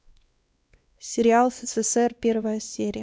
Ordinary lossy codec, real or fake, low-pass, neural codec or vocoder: none; fake; none; codec, 16 kHz, 2 kbps, X-Codec, WavLM features, trained on Multilingual LibriSpeech